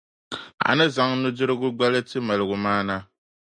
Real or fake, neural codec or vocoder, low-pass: real; none; 9.9 kHz